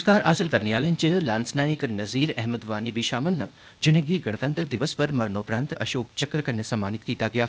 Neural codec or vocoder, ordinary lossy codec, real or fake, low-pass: codec, 16 kHz, 0.8 kbps, ZipCodec; none; fake; none